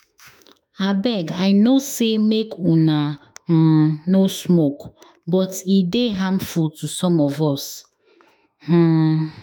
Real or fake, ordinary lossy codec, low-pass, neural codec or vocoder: fake; none; none; autoencoder, 48 kHz, 32 numbers a frame, DAC-VAE, trained on Japanese speech